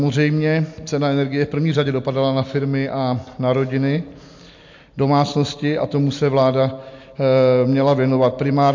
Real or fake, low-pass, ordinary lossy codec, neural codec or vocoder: real; 7.2 kHz; MP3, 48 kbps; none